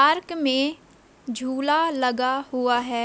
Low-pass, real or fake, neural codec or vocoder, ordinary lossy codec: none; real; none; none